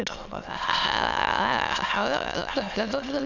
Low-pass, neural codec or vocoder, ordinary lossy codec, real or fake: 7.2 kHz; autoencoder, 22.05 kHz, a latent of 192 numbers a frame, VITS, trained on many speakers; none; fake